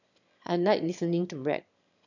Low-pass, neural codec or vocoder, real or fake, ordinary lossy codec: 7.2 kHz; autoencoder, 22.05 kHz, a latent of 192 numbers a frame, VITS, trained on one speaker; fake; none